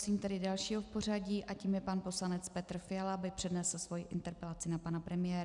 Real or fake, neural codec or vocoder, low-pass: real; none; 10.8 kHz